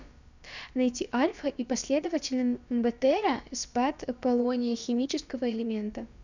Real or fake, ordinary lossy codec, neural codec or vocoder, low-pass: fake; none; codec, 16 kHz, about 1 kbps, DyCAST, with the encoder's durations; 7.2 kHz